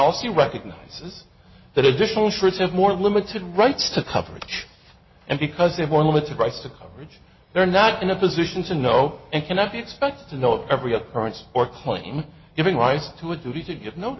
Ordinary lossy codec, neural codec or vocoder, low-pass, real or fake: MP3, 24 kbps; none; 7.2 kHz; real